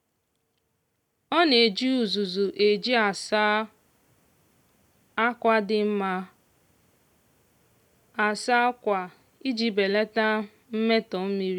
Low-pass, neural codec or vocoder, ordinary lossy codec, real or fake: 19.8 kHz; none; none; real